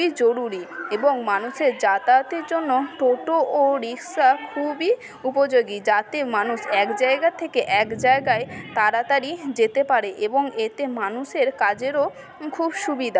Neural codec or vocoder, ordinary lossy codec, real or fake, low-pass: none; none; real; none